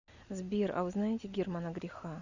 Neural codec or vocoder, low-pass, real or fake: none; 7.2 kHz; real